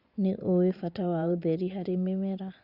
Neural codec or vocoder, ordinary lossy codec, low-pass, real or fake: vocoder, 24 kHz, 100 mel bands, Vocos; Opus, 64 kbps; 5.4 kHz; fake